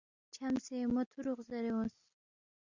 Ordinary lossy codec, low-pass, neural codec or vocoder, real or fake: Opus, 64 kbps; 7.2 kHz; none; real